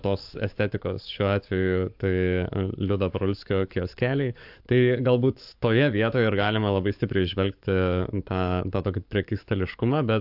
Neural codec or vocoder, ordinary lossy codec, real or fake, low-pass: codec, 44.1 kHz, 7.8 kbps, DAC; AAC, 48 kbps; fake; 5.4 kHz